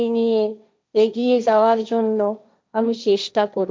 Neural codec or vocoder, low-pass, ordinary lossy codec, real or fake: codec, 16 kHz, 1.1 kbps, Voila-Tokenizer; none; none; fake